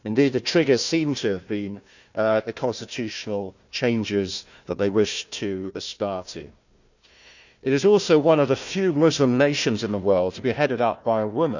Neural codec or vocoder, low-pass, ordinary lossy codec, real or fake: codec, 16 kHz, 1 kbps, FunCodec, trained on Chinese and English, 50 frames a second; 7.2 kHz; none; fake